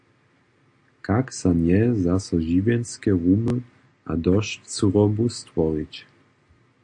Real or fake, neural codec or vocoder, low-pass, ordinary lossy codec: real; none; 9.9 kHz; AAC, 64 kbps